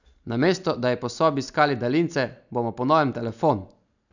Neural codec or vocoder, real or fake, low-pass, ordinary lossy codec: none; real; 7.2 kHz; none